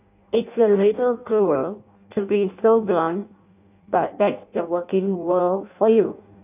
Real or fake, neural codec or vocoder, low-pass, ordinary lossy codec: fake; codec, 16 kHz in and 24 kHz out, 0.6 kbps, FireRedTTS-2 codec; 3.6 kHz; none